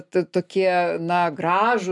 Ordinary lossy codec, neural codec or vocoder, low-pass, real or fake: MP3, 96 kbps; none; 10.8 kHz; real